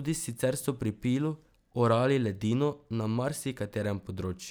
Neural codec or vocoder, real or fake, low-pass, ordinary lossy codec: none; real; none; none